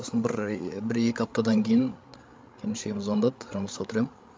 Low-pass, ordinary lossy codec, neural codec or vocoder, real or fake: none; none; codec, 16 kHz, 16 kbps, FreqCodec, larger model; fake